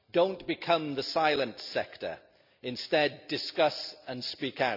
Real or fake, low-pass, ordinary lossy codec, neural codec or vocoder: real; 5.4 kHz; none; none